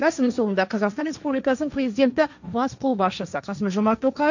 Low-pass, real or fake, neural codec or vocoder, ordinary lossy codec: 7.2 kHz; fake; codec, 16 kHz, 1.1 kbps, Voila-Tokenizer; none